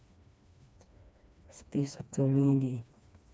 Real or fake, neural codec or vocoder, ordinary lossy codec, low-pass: fake; codec, 16 kHz, 2 kbps, FreqCodec, smaller model; none; none